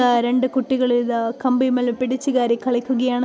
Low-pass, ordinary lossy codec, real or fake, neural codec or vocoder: none; none; real; none